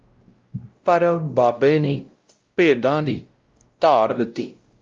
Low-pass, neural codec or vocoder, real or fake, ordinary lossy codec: 7.2 kHz; codec, 16 kHz, 0.5 kbps, X-Codec, WavLM features, trained on Multilingual LibriSpeech; fake; Opus, 32 kbps